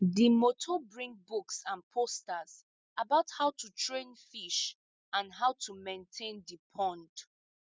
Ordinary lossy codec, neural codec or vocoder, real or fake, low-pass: none; none; real; none